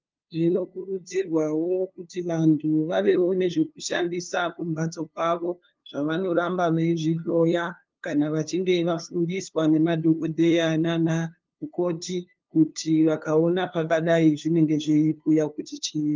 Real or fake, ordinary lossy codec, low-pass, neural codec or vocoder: fake; Opus, 32 kbps; 7.2 kHz; codec, 16 kHz, 2 kbps, FunCodec, trained on LibriTTS, 25 frames a second